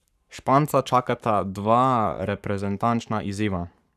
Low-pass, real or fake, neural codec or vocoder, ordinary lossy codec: 14.4 kHz; fake; codec, 44.1 kHz, 7.8 kbps, Pupu-Codec; none